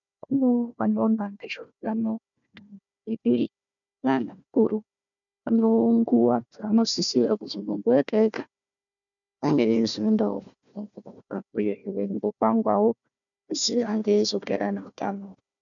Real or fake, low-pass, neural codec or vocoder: fake; 7.2 kHz; codec, 16 kHz, 1 kbps, FunCodec, trained on Chinese and English, 50 frames a second